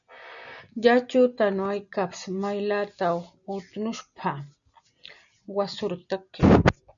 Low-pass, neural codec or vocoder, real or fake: 7.2 kHz; none; real